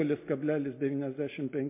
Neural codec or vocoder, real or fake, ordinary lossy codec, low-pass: none; real; MP3, 24 kbps; 3.6 kHz